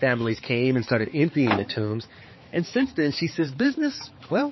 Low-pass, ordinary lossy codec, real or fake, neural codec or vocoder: 7.2 kHz; MP3, 24 kbps; fake; codec, 16 kHz, 4 kbps, X-Codec, HuBERT features, trained on balanced general audio